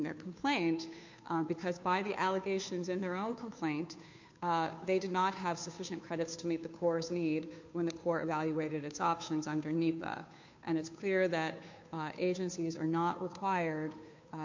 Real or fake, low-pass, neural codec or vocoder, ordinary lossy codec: fake; 7.2 kHz; codec, 16 kHz, 2 kbps, FunCodec, trained on Chinese and English, 25 frames a second; MP3, 48 kbps